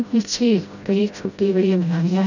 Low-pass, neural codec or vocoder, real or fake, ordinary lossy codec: 7.2 kHz; codec, 16 kHz, 0.5 kbps, FreqCodec, smaller model; fake; none